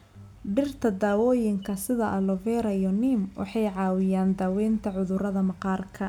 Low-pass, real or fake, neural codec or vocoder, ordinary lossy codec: 19.8 kHz; real; none; none